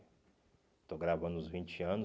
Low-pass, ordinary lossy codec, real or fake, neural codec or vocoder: none; none; real; none